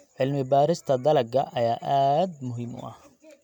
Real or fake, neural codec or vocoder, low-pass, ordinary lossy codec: real; none; 19.8 kHz; none